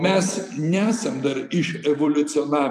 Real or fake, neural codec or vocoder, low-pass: fake; vocoder, 44.1 kHz, 128 mel bands every 256 samples, BigVGAN v2; 14.4 kHz